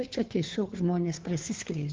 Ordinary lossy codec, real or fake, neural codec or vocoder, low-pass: Opus, 32 kbps; fake; codec, 16 kHz, 2 kbps, FunCodec, trained on Chinese and English, 25 frames a second; 7.2 kHz